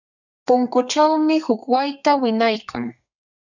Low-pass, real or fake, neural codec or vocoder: 7.2 kHz; fake; codec, 32 kHz, 1.9 kbps, SNAC